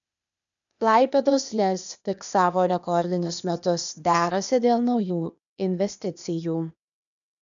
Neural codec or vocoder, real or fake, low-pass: codec, 16 kHz, 0.8 kbps, ZipCodec; fake; 7.2 kHz